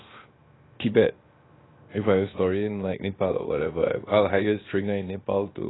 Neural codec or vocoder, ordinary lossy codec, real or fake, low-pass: codec, 16 kHz, 0.7 kbps, FocalCodec; AAC, 16 kbps; fake; 7.2 kHz